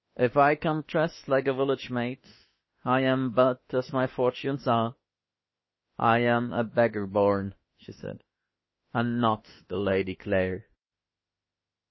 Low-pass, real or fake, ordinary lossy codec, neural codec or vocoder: 7.2 kHz; fake; MP3, 24 kbps; autoencoder, 48 kHz, 32 numbers a frame, DAC-VAE, trained on Japanese speech